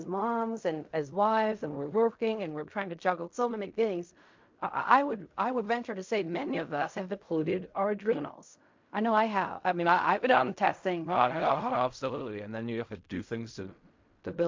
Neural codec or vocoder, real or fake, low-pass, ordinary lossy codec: codec, 16 kHz in and 24 kHz out, 0.4 kbps, LongCat-Audio-Codec, fine tuned four codebook decoder; fake; 7.2 kHz; MP3, 48 kbps